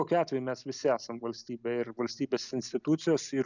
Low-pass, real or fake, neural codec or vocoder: 7.2 kHz; real; none